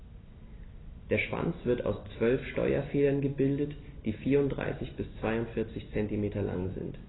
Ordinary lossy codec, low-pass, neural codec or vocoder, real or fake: AAC, 16 kbps; 7.2 kHz; none; real